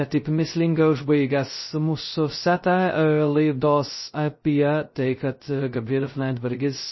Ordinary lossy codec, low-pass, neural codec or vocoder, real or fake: MP3, 24 kbps; 7.2 kHz; codec, 16 kHz, 0.2 kbps, FocalCodec; fake